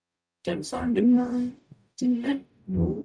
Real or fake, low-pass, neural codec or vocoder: fake; 9.9 kHz; codec, 44.1 kHz, 0.9 kbps, DAC